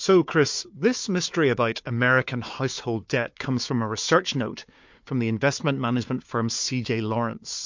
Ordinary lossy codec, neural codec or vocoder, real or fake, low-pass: MP3, 48 kbps; codec, 16 kHz, 4 kbps, FunCodec, trained on Chinese and English, 50 frames a second; fake; 7.2 kHz